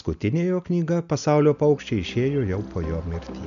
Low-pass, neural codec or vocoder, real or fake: 7.2 kHz; none; real